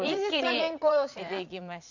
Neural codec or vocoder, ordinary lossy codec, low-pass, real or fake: vocoder, 22.05 kHz, 80 mel bands, Vocos; none; 7.2 kHz; fake